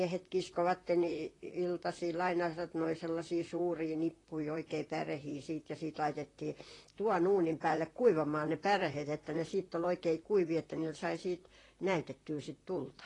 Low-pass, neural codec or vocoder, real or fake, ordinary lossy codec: 10.8 kHz; vocoder, 44.1 kHz, 128 mel bands, Pupu-Vocoder; fake; AAC, 32 kbps